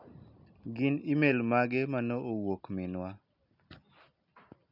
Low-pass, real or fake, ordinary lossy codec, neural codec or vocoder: 5.4 kHz; real; none; none